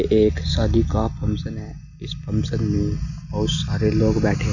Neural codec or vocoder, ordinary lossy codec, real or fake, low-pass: none; none; real; 7.2 kHz